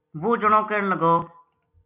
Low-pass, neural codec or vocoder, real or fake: 3.6 kHz; none; real